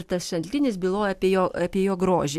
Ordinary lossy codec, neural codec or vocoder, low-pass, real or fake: AAC, 96 kbps; none; 14.4 kHz; real